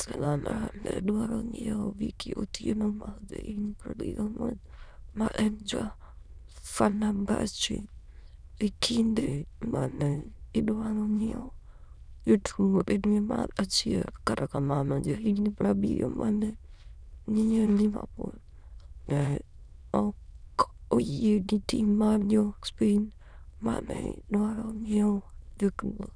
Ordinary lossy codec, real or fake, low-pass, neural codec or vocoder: none; fake; none; autoencoder, 22.05 kHz, a latent of 192 numbers a frame, VITS, trained on many speakers